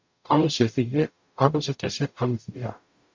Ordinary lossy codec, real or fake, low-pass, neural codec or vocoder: MP3, 64 kbps; fake; 7.2 kHz; codec, 44.1 kHz, 0.9 kbps, DAC